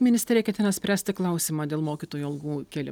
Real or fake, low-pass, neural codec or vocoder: real; 19.8 kHz; none